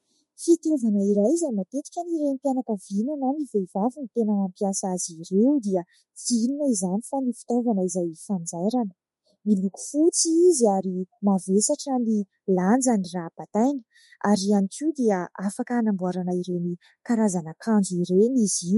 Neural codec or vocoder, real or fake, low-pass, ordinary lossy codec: autoencoder, 48 kHz, 32 numbers a frame, DAC-VAE, trained on Japanese speech; fake; 19.8 kHz; MP3, 48 kbps